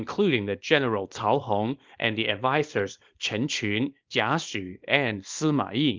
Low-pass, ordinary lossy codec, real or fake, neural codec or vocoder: 7.2 kHz; Opus, 32 kbps; fake; codec, 24 kHz, 0.9 kbps, WavTokenizer, small release